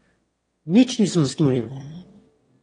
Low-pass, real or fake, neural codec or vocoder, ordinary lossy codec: 9.9 kHz; fake; autoencoder, 22.05 kHz, a latent of 192 numbers a frame, VITS, trained on one speaker; AAC, 32 kbps